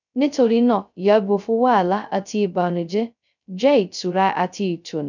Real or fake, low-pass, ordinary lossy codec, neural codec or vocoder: fake; 7.2 kHz; none; codec, 16 kHz, 0.2 kbps, FocalCodec